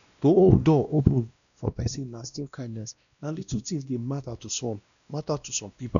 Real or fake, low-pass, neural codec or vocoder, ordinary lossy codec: fake; 7.2 kHz; codec, 16 kHz, 1 kbps, X-Codec, WavLM features, trained on Multilingual LibriSpeech; none